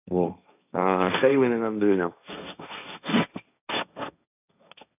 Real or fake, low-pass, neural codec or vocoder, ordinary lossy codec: fake; 3.6 kHz; codec, 16 kHz, 1.1 kbps, Voila-Tokenizer; none